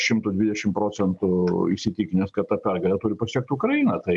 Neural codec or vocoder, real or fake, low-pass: none; real; 10.8 kHz